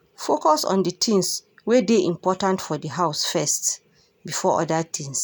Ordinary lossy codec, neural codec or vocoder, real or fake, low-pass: none; none; real; none